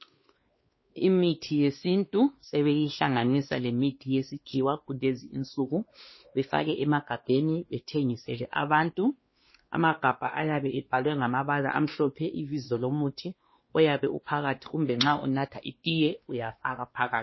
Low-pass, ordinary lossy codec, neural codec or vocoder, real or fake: 7.2 kHz; MP3, 24 kbps; codec, 16 kHz, 2 kbps, X-Codec, WavLM features, trained on Multilingual LibriSpeech; fake